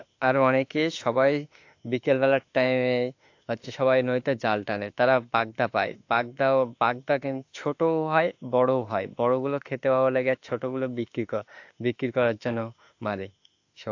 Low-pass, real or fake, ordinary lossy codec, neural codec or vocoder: 7.2 kHz; fake; AAC, 48 kbps; autoencoder, 48 kHz, 32 numbers a frame, DAC-VAE, trained on Japanese speech